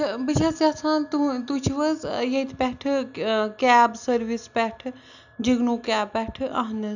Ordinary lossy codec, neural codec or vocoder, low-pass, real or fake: none; none; 7.2 kHz; real